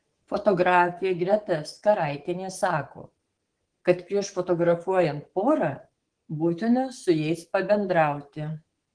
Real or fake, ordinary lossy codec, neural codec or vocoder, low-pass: fake; Opus, 16 kbps; codec, 24 kHz, 3.1 kbps, DualCodec; 9.9 kHz